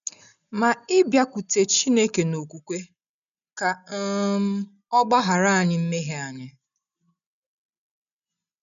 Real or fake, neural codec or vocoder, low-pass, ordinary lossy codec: real; none; 7.2 kHz; MP3, 96 kbps